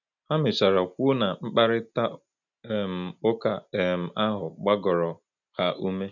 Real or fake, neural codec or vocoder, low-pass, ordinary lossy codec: real; none; 7.2 kHz; none